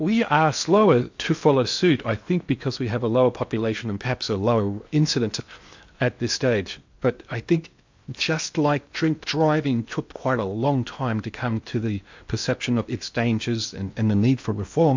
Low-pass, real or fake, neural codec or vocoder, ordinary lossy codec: 7.2 kHz; fake; codec, 16 kHz in and 24 kHz out, 0.8 kbps, FocalCodec, streaming, 65536 codes; MP3, 64 kbps